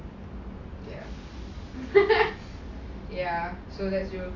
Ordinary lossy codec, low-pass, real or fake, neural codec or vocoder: AAC, 32 kbps; 7.2 kHz; real; none